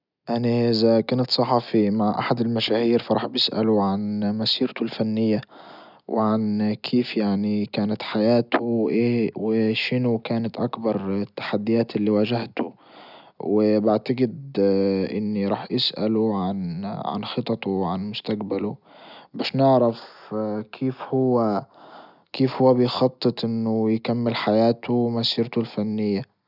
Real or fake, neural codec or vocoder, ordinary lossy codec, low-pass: real; none; none; 5.4 kHz